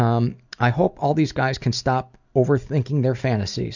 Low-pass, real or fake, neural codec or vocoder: 7.2 kHz; real; none